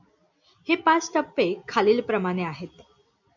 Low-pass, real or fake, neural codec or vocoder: 7.2 kHz; real; none